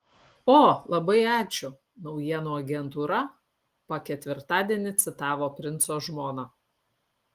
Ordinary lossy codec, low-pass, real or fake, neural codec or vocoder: Opus, 24 kbps; 14.4 kHz; real; none